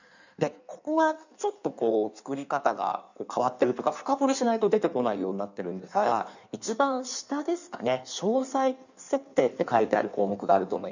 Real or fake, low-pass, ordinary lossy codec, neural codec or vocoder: fake; 7.2 kHz; none; codec, 16 kHz in and 24 kHz out, 1.1 kbps, FireRedTTS-2 codec